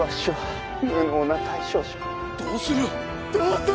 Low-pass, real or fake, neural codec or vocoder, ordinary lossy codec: none; real; none; none